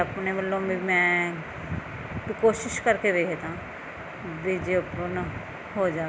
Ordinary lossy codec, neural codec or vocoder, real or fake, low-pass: none; none; real; none